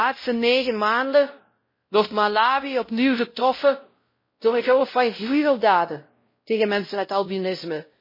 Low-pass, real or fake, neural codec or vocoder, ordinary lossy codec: 5.4 kHz; fake; codec, 16 kHz, 0.5 kbps, X-Codec, WavLM features, trained on Multilingual LibriSpeech; MP3, 24 kbps